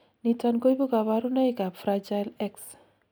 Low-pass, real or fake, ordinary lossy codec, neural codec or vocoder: none; real; none; none